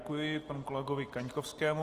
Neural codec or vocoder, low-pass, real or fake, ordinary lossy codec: none; 14.4 kHz; real; Opus, 24 kbps